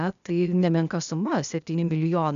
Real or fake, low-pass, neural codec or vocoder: fake; 7.2 kHz; codec, 16 kHz, 0.8 kbps, ZipCodec